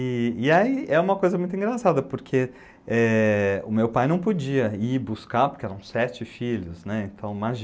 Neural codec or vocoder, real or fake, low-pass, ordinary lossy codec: none; real; none; none